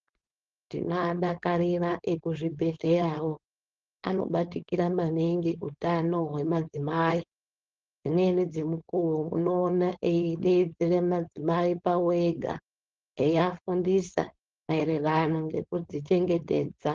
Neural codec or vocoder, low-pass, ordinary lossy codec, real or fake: codec, 16 kHz, 4.8 kbps, FACodec; 7.2 kHz; Opus, 16 kbps; fake